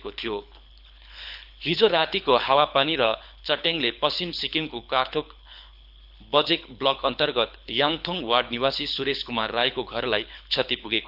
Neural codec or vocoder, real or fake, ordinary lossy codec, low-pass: codec, 24 kHz, 6 kbps, HILCodec; fake; none; 5.4 kHz